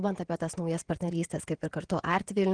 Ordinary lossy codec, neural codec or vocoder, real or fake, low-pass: Opus, 16 kbps; vocoder, 48 kHz, 128 mel bands, Vocos; fake; 9.9 kHz